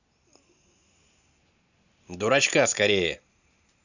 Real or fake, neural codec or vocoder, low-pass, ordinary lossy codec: real; none; 7.2 kHz; none